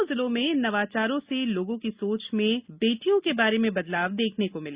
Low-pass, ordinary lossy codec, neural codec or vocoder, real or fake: 3.6 kHz; Opus, 64 kbps; none; real